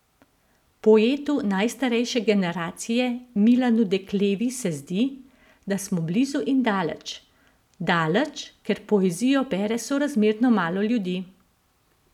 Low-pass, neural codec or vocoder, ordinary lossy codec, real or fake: 19.8 kHz; none; none; real